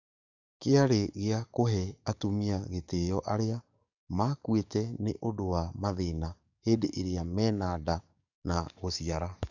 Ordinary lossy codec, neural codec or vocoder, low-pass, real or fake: none; codec, 44.1 kHz, 7.8 kbps, DAC; 7.2 kHz; fake